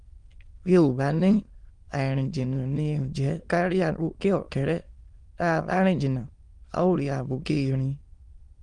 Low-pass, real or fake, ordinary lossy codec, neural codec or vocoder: 9.9 kHz; fake; Opus, 24 kbps; autoencoder, 22.05 kHz, a latent of 192 numbers a frame, VITS, trained on many speakers